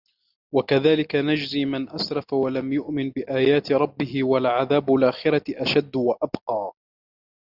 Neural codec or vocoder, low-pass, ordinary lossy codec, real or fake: none; 5.4 kHz; Opus, 64 kbps; real